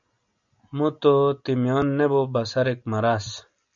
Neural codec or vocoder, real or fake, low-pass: none; real; 7.2 kHz